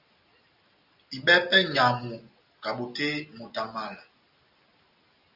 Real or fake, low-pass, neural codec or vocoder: real; 5.4 kHz; none